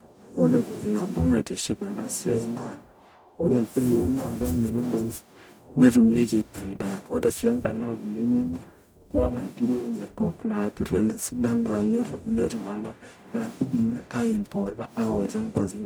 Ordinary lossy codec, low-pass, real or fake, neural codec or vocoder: none; none; fake; codec, 44.1 kHz, 0.9 kbps, DAC